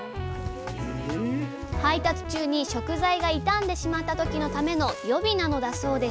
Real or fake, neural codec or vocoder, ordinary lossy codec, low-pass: real; none; none; none